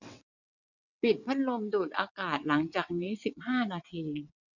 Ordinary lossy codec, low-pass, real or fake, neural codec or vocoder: none; 7.2 kHz; fake; vocoder, 22.05 kHz, 80 mel bands, WaveNeXt